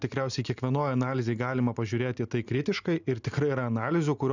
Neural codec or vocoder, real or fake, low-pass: none; real; 7.2 kHz